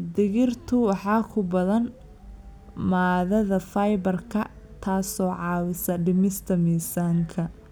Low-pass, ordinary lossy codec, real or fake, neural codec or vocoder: none; none; real; none